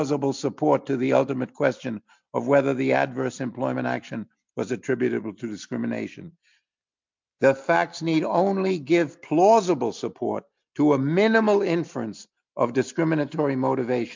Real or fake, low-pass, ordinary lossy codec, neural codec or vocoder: real; 7.2 kHz; MP3, 64 kbps; none